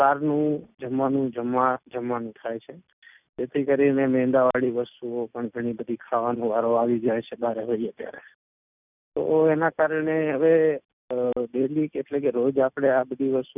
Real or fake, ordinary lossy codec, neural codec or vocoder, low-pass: real; none; none; 3.6 kHz